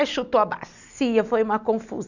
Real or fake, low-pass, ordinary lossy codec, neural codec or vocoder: real; 7.2 kHz; none; none